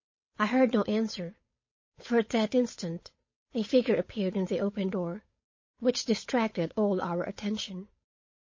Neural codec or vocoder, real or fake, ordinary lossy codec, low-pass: codec, 16 kHz, 8 kbps, FunCodec, trained on Chinese and English, 25 frames a second; fake; MP3, 32 kbps; 7.2 kHz